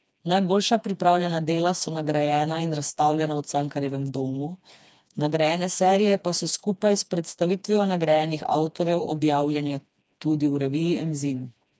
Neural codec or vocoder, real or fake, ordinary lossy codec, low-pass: codec, 16 kHz, 2 kbps, FreqCodec, smaller model; fake; none; none